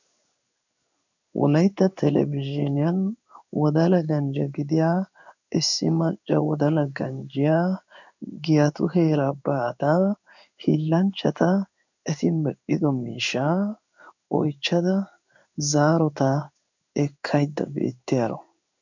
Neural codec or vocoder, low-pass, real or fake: codec, 16 kHz in and 24 kHz out, 1 kbps, XY-Tokenizer; 7.2 kHz; fake